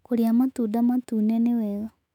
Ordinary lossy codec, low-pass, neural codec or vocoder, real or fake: none; 19.8 kHz; autoencoder, 48 kHz, 128 numbers a frame, DAC-VAE, trained on Japanese speech; fake